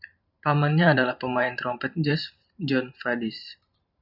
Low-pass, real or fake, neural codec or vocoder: 5.4 kHz; fake; vocoder, 44.1 kHz, 128 mel bands every 512 samples, BigVGAN v2